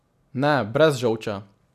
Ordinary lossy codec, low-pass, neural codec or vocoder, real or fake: none; 14.4 kHz; none; real